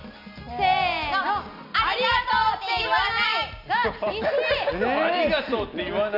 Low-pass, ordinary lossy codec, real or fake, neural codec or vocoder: 5.4 kHz; AAC, 48 kbps; real; none